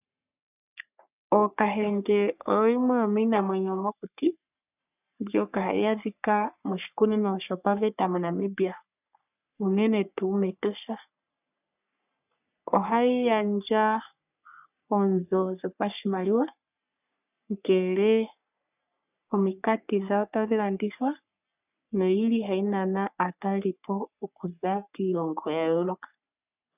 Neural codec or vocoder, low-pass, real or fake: codec, 44.1 kHz, 3.4 kbps, Pupu-Codec; 3.6 kHz; fake